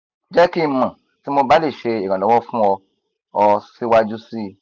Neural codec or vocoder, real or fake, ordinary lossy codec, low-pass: none; real; none; 7.2 kHz